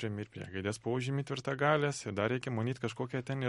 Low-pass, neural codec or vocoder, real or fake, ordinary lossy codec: 19.8 kHz; none; real; MP3, 48 kbps